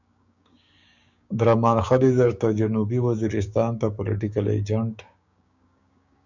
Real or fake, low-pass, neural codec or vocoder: fake; 7.2 kHz; codec, 16 kHz, 6 kbps, DAC